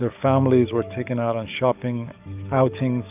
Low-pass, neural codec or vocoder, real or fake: 3.6 kHz; codec, 44.1 kHz, 7.8 kbps, DAC; fake